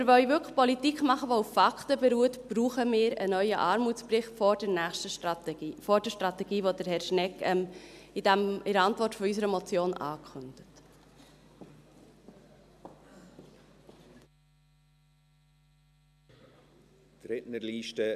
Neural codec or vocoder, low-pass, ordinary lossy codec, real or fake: none; 14.4 kHz; none; real